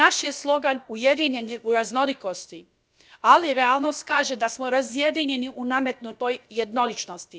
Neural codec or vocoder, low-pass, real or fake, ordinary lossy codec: codec, 16 kHz, about 1 kbps, DyCAST, with the encoder's durations; none; fake; none